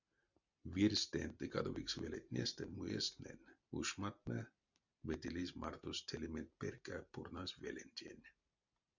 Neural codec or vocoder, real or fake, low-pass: none; real; 7.2 kHz